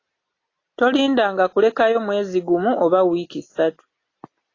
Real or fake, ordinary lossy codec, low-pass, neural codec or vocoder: real; AAC, 32 kbps; 7.2 kHz; none